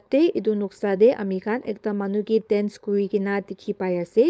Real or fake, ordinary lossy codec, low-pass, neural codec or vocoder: fake; none; none; codec, 16 kHz, 4.8 kbps, FACodec